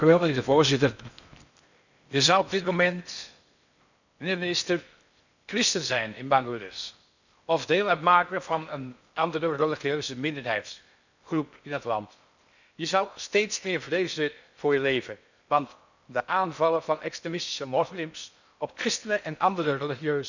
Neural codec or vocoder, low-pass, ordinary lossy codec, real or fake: codec, 16 kHz in and 24 kHz out, 0.6 kbps, FocalCodec, streaming, 4096 codes; 7.2 kHz; none; fake